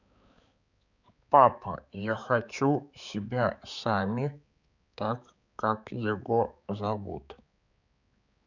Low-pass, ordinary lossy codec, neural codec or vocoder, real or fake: 7.2 kHz; none; codec, 16 kHz, 4 kbps, X-Codec, HuBERT features, trained on balanced general audio; fake